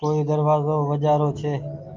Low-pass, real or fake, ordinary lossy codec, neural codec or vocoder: 7.2 kHz; real; Opus, 24 kbps; none